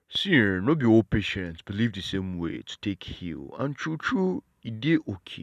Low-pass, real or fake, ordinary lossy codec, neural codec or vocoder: 14.4 kHz; real; none; none